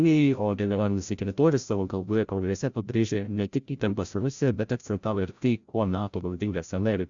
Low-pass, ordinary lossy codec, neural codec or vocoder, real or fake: 7.2 kHz; Opus, 64 kbps; codec, 16 kHz, 0.5 kbps, FreqCodec, larger model; fake